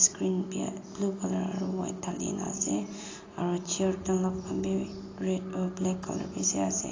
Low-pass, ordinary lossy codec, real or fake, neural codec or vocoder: 7.2 kHz; AAC, 32 kbps; real; none